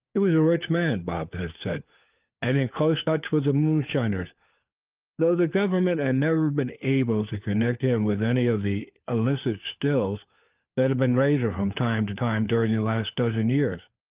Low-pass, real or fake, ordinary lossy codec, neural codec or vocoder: 3.6 kHz; fake; Opus, 32 kbps; codec, 16 kHz, 4 kbps, FunCodec, trained on LibriTTS, 50 frames a second